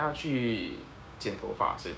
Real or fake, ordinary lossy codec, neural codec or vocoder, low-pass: fake; none; codec, 16 kHz, 6 kbps, DAC; none